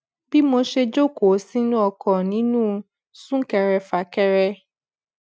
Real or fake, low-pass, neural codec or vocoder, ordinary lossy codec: real; none; none; none